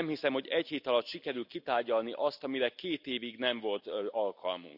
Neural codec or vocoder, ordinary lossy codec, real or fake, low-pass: none; none; real; 5.4 kHz